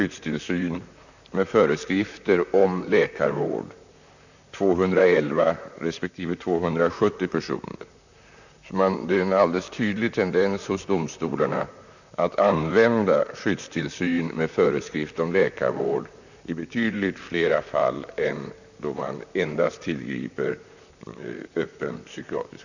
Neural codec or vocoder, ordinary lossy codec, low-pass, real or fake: vocoder, 44.1 kHz, 128 mel bands, Pupu-Vocoder; none; 7.2 kHz; fake